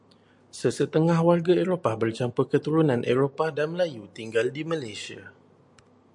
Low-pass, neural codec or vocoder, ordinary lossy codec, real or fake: 10.8 kHz; none; MP3, 64 kbps; real